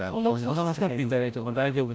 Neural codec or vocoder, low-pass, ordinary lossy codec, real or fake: codec, 16 kHz, 0.5 kbps, FreqCodec, larger model; none; none; fake